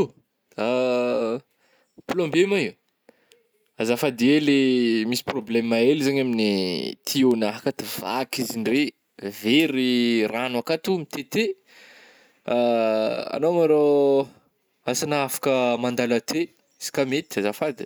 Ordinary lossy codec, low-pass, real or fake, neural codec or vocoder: none; none; real; none